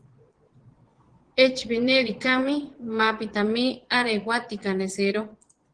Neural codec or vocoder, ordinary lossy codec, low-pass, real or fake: vocoder, 22.05 kHz, 80 mel bands, Vocos; Opus, 16 kbps; 9.9 kHz; fake